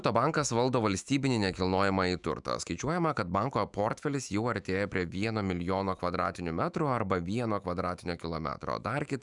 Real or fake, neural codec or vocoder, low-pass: fake; autoencoder, 48 kHz, 128 numbers a frame, DAC-VAE, trained on Japanese speech; 10.8 kHz